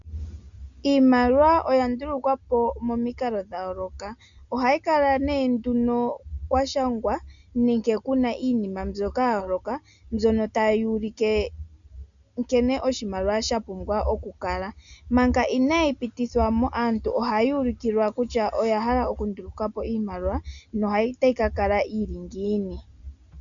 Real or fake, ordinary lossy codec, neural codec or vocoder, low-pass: real; MP3, 96 kbps; none; 7.2 kHz